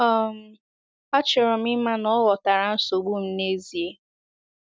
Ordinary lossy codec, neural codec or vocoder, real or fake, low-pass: none; none; real; none